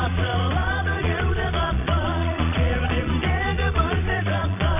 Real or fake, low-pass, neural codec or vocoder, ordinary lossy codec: fake; 3.6 kHz; vocoder, 22.05 kHz, 80 mel bands, Vocos; none